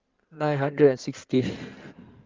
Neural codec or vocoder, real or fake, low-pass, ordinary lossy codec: codec, 16 kHz in and 24 kHz out, 2.2 kbps, FireRedTTS-2 codec; fake; 7.2 kHz; Opus, 24 kbps